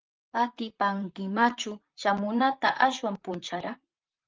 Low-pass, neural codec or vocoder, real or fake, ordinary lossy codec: 7.2 kHz; vocoder, 44.1 kHz, 128 mel bands, Pupu-Vocoder; fake; Opus, 32 kbps